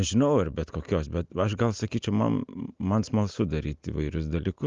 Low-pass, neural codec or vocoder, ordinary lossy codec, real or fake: 7.2 kHz; none; Opus, 32 kbps; real